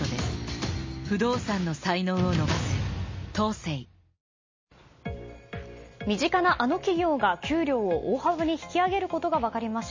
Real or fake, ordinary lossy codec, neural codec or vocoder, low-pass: real; MP3, 32 kbps; none; 7.2 kHz